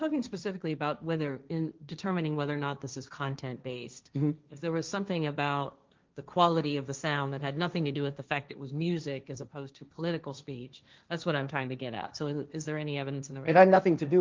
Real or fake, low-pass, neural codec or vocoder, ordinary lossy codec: fake; 7.2 kHz; codec, 16 kHz, 1.1 kbps, Voila-Tokenizer; Opus, 24 kbps